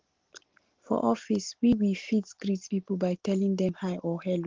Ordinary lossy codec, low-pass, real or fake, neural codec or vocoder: Opus, 16 kbps; 7.2 kHz; real; none